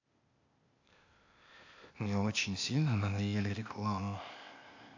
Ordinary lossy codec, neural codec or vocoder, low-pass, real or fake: AAC, 48 kbps; codec, 16 kHz, 0.8 kbps, ZipCodec; 7.2 kHz; fake